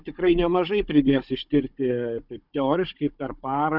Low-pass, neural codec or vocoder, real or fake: 5.4 kHz; codec, 16 kHz, 16 kbps, FunCodec, trained on Chinese and English, 50 frames a second; fake